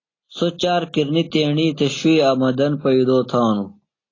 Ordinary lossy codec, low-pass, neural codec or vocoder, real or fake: AAC, 32 kbps; 7.2 kHz; none; real